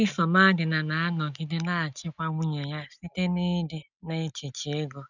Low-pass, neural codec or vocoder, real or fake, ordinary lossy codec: 7.2 kHz; none; real; none